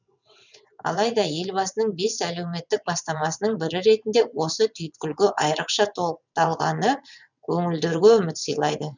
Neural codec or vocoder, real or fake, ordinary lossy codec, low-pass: vocoder, 22.05 kHz, 80 mel bands, WaveNeXt; fake; none; 7.2 kHz